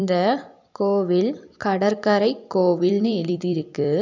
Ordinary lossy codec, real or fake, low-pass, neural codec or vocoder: none; fake; 7.2 kHz; vocoder, 44.1 kHz, 128 mel bands every 256 samples, BigVGAN v2